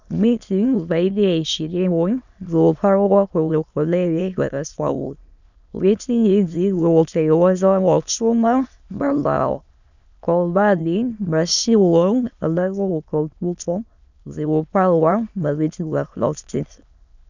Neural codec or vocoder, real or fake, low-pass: autoencoder, 22.05 kHz, a latent of 192 numbers a frame, VITS, trained on many speakers; fake; 7.2 kHz